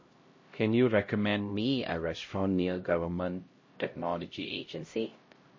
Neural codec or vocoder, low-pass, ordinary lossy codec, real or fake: codec, 16 kHz, 0.5 kbps, X-Codec, HuBERT features, trained on LibriSpeech; 7.2 kHz; MP3, 32 kbps; fake